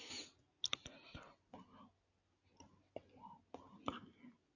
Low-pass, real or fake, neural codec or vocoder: 7.2 kHz; real; none